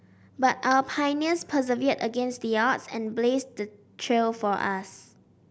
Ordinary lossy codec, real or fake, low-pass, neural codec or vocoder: none; real; none; none